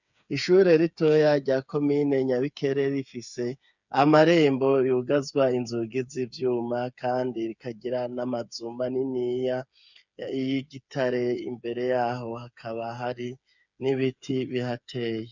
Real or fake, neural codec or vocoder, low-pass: fake; codec, 16 kHz, 8 kbps, FreqCodec, smaller model; 7.2 kHz